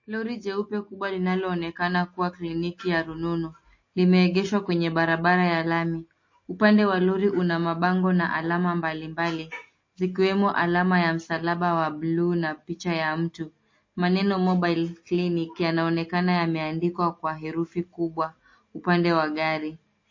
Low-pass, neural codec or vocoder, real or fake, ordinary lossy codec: 7.2 kHz; none; real; MP3, 32 kbps